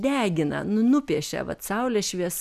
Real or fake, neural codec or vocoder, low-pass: real; none; 14.4 kHz